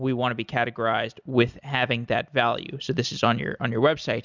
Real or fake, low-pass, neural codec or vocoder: real; 7.2 kHz; none